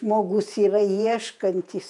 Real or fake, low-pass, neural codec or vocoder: fake; 10.8 kHz; vocoder, 48 kHz, 128 mel bands, Vocos